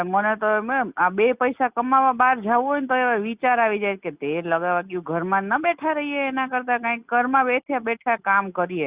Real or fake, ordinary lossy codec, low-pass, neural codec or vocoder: real; Opus, 64 kbps; 3.6 kHz; none